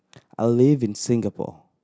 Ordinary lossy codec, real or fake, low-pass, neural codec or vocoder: none; real; none; none